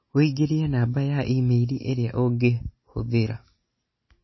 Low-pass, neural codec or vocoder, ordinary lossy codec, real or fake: 7.2 kHz; none; MP3, 24 kbps; real